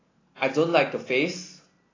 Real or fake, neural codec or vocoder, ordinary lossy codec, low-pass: real; none; AAC, 32 kbps; 7.2 kHz